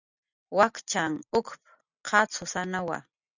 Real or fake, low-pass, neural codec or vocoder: real; 7.2 kHz; none